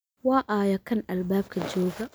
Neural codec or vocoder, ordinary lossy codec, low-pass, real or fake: none; none; none; real